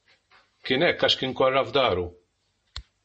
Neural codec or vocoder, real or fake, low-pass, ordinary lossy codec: none; real; 10.8 kHz; MP3, 32 kbps